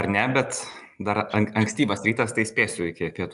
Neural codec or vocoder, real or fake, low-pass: none; real; 10.8 kHz